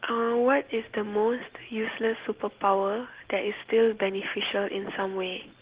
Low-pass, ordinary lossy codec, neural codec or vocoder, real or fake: 3.6 kHz; Opus, 16 kbps; none; real